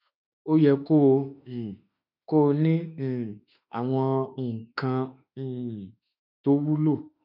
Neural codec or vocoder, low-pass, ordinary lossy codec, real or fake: autoencoder, 48 kHz, 32 numbers a frame, DAC-VAE, trained on Japanese speech; 5.4 kHz; none; fake